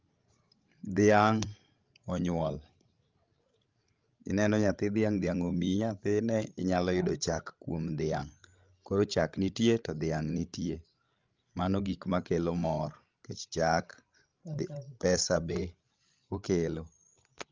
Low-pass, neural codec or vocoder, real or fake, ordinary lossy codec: 7.2 kHz; codec, 16 kHz, 16 kbps, FreqCodec, larger model; fake; Opus, 24 kbps